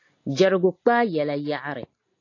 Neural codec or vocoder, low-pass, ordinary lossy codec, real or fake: none; 7.2 kHz; AAC, 32 kbps; real